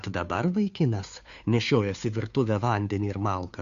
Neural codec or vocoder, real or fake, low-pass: codec, 16 kHz, 2 kbps, FunCodec, trained on Chinese and English, 25 frames a second; fake; 7.2 kHz